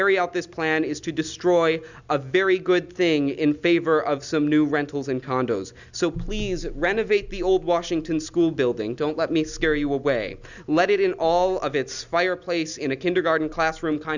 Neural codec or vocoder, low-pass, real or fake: none; 7.2 kHz; real